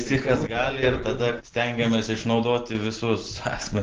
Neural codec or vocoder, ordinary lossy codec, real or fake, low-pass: none; Opus, 16 kbps; real; 7.2 kHz